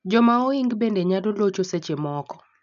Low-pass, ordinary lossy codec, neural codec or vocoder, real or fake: 7.2 kHz; none; none; real